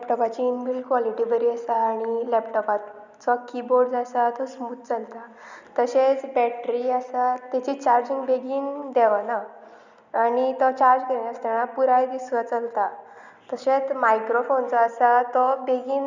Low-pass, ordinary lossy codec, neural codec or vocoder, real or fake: 7.2 kHz; none; none; real